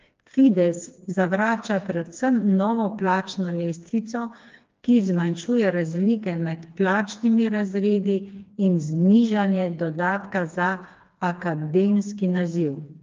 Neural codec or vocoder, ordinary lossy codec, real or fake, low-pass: codec, 16 kHz, 2 kbps, FreqCodec, smaller model; Opus, 24 kbps; fake; 7.2 kHz